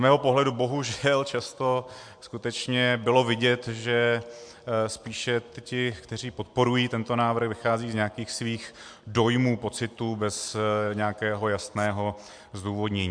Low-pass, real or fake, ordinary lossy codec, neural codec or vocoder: 9.9 kHz; real; MP3, 64 kbps; none